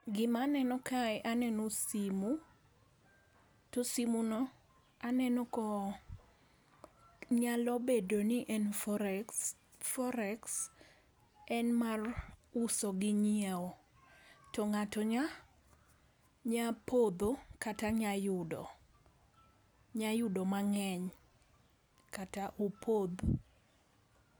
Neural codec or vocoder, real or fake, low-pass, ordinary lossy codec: none; real; none; none